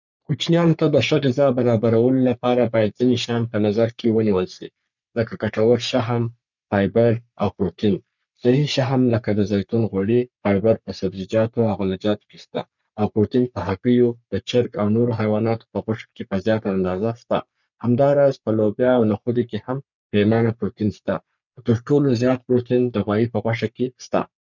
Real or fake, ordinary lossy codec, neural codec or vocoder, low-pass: fake; none; codec, 44.1 kHz, 3.4 kbps, Pupu-Codec; 7.2 kHz